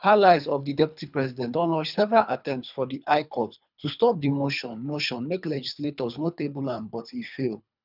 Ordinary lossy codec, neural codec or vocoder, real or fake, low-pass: none; codec, 24 kHz, 3 kbps, HILCodec; fake; 5.4 kHz